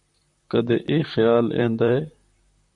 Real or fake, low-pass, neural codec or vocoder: fake; 10.8 kHz; vocoder, 44.1 kHz, 128 mel bands, Pupu-Vocoder